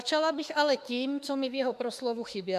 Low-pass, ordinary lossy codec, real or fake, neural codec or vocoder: 14.4 kHz; AAC, 96 kbps; fake; autoencoder, 48 kHz, 32 numbers a frame, DAC-VAE, trained on Japanese speech